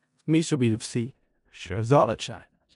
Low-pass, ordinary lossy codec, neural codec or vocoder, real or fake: 10.8 kHz; none; codec, 16 kHz in and 24 kHz out, 0.4 kbps, LongCat-Audio-Codec, four codebook decoder; fake